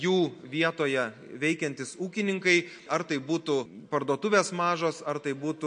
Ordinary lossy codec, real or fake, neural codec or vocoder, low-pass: MP3, 48 kbps; real; none; 9.9 kHz